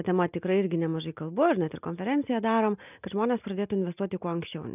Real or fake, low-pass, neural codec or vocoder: real; 3.6 kHz; none